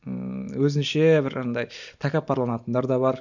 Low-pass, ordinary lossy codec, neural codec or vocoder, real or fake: 7.2 kHz; none; none; real